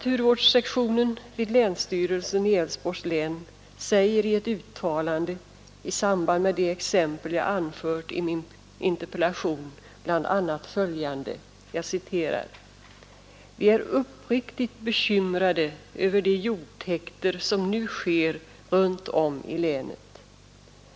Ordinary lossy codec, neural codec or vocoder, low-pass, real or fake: none; none; none; real